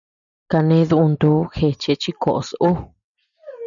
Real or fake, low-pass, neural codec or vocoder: real; 7.2 kHz; none